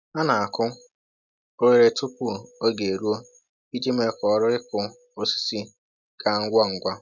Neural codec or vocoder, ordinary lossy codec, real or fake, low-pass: none; none; real; 7.2 kHz